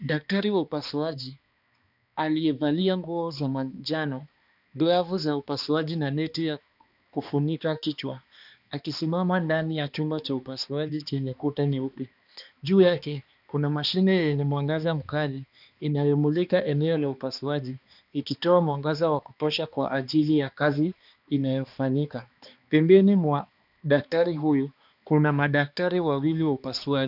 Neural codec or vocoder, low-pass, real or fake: codec, 16 kHz, 2 kbps, X-Codec, HuBERT features, trained on balanced general audio; 5.4 kHz; fake